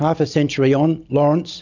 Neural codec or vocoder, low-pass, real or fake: none; 7.2 kHz; real